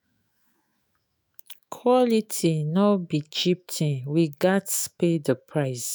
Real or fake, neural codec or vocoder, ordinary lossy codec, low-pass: fake; autoencoder, 48 kHz, 128 numbers a frame, DAC-VAE, trained on Japanese speech; none; none